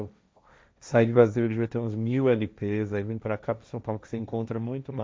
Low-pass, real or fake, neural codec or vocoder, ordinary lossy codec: none; fake; codec, 16 kHz, 1.1 kbps, Voila-Tokenizer; none